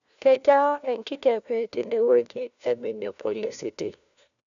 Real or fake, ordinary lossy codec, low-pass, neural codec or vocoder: fake; none; 7.2 kHz; codec, 16 kHz, 1 kbps, FunCodec, trained on LibriTTS, 50 frames a second